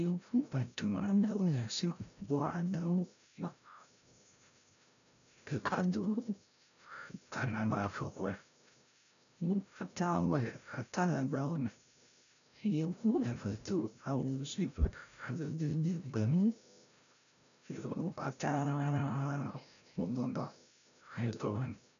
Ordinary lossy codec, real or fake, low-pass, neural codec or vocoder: MP3, 96 kbps; fake; 7.2 kHz; codec, 16 kHz, 0.5 kbps, FreqCodec, larger model